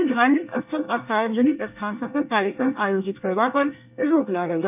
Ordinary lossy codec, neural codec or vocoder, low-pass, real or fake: none; codec, 24 kHz, 1 kbps, SNAC; 3.6 kHz; fake